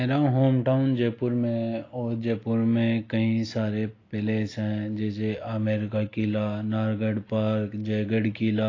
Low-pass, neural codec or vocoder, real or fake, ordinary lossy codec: 7.2 kHz; none; real; AAC, 32 kbps